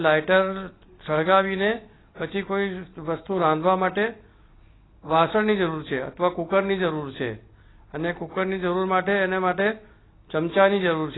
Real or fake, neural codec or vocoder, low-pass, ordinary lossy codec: real; none; 7.2 kHz; AAC, 16 kbps